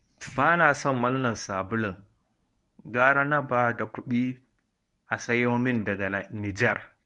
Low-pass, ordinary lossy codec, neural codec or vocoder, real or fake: 10.8 kHz; none; codec, 24 kHz, 0.9 kbps, WavTokenizer, medium speech release version 1; fake